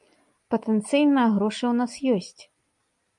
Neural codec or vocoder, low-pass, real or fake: none; 10.8 kHz; real